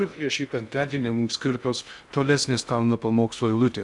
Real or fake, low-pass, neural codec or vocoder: fake; 10.8 kHz; codec, 16 kHz in and 24 kHz out, 0.6 kbps, FocalCodec, streaming, 2048 codes